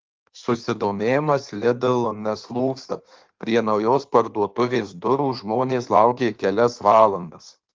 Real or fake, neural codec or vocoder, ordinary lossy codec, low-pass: fake; codec, 16 kHz in and 24 kHz out, 1.1 kbps, FireRedTTS-2 codec; Opus, 32 kbps; 7.2 kHz